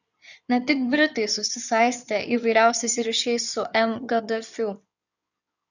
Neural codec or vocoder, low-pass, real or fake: codec, 16 kHz in and 24 kHz out, 2.2 kbps, FireRedTTS-2 codec; 7.2 kHz; fake